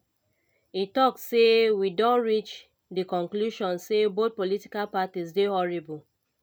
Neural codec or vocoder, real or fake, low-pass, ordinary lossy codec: none; real; 19.8 kHz; none